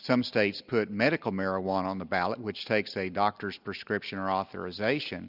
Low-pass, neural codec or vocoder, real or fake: 5.4 kHz; none; real